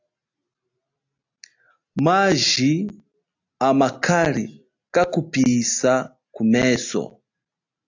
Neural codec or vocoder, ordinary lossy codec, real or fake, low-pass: none; AAC, 48 kbps; real; 7.2 kHz